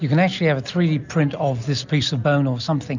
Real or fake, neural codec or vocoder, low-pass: real; none; 7.2 kHz